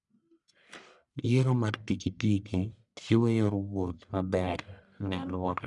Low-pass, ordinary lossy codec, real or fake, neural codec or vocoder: 10.8 kHz; none; fake; codec, 44.1 kHz, 1.7 kbps, Pupu-Codec